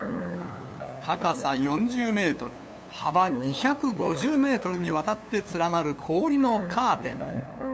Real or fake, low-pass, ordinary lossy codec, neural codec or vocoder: fake; none; none; codec, 16 kHz, 2 kbps, FunCodec, trained on LibriTTS, 25 frames a second